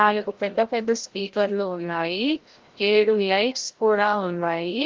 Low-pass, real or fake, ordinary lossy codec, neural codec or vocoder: 7.2 kHz; fake; Opus, 16 kbps; codec, 16 kHz, 0.5 kbps, FreqCodec, larger model